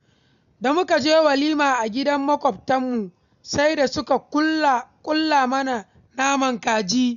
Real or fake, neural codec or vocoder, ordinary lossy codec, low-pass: real; none; none; 7.2 kHz